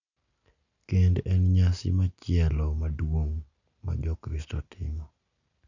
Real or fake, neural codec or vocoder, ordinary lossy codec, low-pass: real; none; none; 7.2 kHz